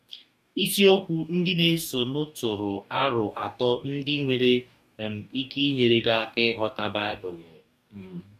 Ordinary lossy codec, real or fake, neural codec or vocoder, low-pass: none; fake; codec, 44.1 kHz, 2.6 kbps, DAC; 14.4 kHz